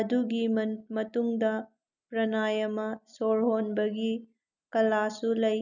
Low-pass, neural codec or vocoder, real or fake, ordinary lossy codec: 7.2 kHz; none; real; none